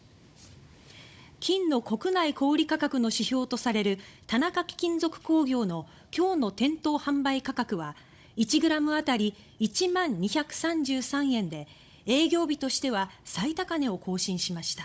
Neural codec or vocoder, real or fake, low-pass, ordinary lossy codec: codec, 16 kHz, 16 kbps, FunCodec, trained on Chinese and English, 50 frames a second; fake; none; none